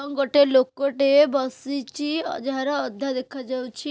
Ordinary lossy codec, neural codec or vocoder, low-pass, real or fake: none; none; none; real